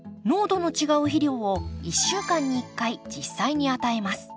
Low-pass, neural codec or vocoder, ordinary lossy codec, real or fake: none; none; none; real